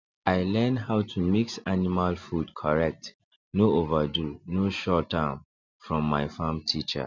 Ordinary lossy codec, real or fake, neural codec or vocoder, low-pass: none; real; none; 7.2 kHz